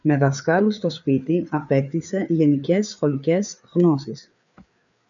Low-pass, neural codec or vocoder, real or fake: 7.2 kHz; codec, 16 kHz, 4 kbps, FreqCodec, larger model; fake